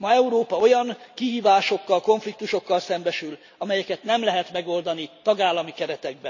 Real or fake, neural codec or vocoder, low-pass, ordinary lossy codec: real; none; 7.2 kHz; none